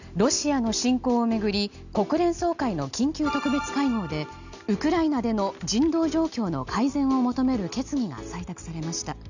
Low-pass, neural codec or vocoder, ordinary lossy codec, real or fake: 7.2 kHz; none; none; real